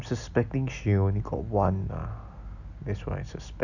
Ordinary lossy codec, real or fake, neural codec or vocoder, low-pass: none; real; none; 7.2 kHz